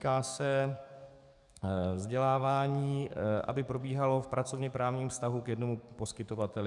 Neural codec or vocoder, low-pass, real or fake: codec, 44.1 kHz, 7.8 kbps, DAC; 10.8 kHz; fake